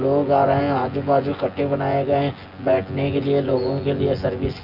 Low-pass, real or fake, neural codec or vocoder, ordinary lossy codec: 5.4 kHz; fake; vocoder, 24 kHz, 100 mel bands, Vocos; Opus, 32 kbps